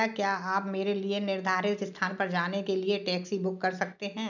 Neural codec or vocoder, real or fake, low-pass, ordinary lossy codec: none; real; 7.2 kHz; none